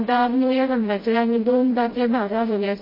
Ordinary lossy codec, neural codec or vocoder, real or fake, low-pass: MP3, 24 kbps; codec, 16 kHz, 0.5 kbps, FreqCodec, smaller model; fake; 5.4 kHz